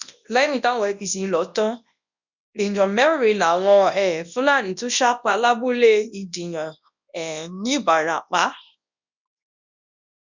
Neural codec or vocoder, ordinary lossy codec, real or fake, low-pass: codec, 24 kHz, 0.9 kbps, WavTokenizer, large speech release; none; fake; 7.2 kHz